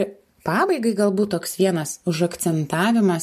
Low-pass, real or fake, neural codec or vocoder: 14.4 kHz; real; none